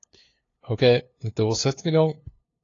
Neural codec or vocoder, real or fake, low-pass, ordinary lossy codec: codec, 16 kHz, 2 kbps, FunCodec, trained on LibriTTS, 25 frames a second; fake; 7.2 kHz; AAC, 32 kbps